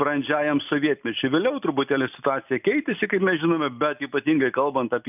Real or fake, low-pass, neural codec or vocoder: real; 3.6 kHz; none